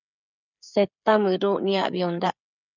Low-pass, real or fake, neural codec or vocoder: 7.2 kHz; fake; codec, 16 kHz, 8 kbps, FreqCodec, smaller model